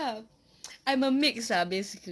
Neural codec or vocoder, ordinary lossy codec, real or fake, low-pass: none; none; real; none